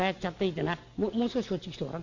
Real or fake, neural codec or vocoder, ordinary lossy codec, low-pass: real; none; none; 7.2 kHz